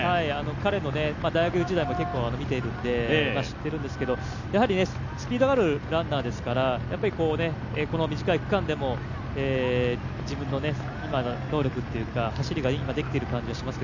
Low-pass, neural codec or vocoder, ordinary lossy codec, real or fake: 7.2 kHz; none; none; real